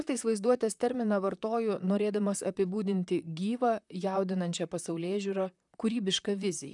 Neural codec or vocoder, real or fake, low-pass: vocoder, 44.1 kHz, 128 mel bands, Pupu-Vocoder; fake; 10.8 kHz